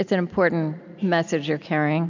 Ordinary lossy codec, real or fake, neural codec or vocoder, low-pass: MP3, 64 kbps; real; none; 7.2 kHz